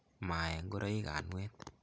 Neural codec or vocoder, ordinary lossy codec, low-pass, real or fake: none; none; none; real